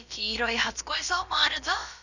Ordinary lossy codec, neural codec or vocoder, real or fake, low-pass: none; codec, 16 kHz, about 1 kbps, DyCAST, with the encoder's durations; fake; 7.2 kHz